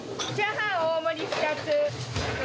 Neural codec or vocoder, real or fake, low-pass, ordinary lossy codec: none; real; none; none